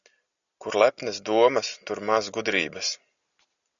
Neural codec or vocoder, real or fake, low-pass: none; real; 7.2 kHz